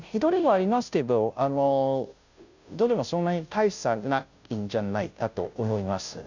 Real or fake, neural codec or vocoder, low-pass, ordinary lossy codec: fake; codec, 16 kHz, 0.5 kbps, FunCodec, trained on Chinese and English, 25 frames a second; 7.2 kHz; none